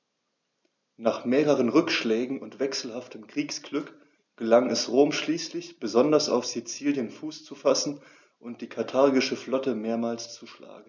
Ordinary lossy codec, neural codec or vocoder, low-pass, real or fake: none; none; 7.2 kHz; real